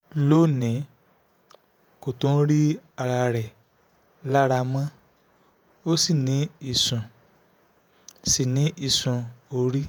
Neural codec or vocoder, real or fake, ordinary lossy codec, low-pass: vocoder, 48 kHz, 128 mel bands, Vocos; fake; none; none